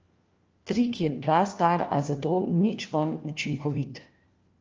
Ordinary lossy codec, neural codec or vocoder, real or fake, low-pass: Opus, 24 kbps; codec, 16 kHz, 1 kbps, FunCodec, trained on LibriTTS, 50 frames a second; fake; 7.2 kHz